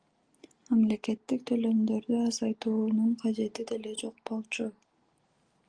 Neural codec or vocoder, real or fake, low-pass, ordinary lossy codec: none; real; 9.9 kHz; Opus, 24 kbps